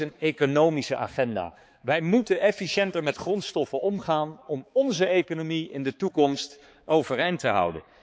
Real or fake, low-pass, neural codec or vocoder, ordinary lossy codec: fake; none; codec, 16 kHz, 4 kbps, X-Codec, HuBERT features, trained on balanced general audio; none